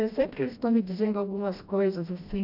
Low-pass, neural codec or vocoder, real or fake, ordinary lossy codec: 5.4 kHz; codec, 16 kHz, 1 kbps, FreqCodec, smaller model; fake; none